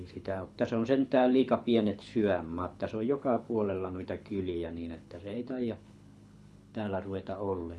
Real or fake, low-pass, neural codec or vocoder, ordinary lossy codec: fake; none; codec, 24 kHz, 6 kbps, HILCodec; none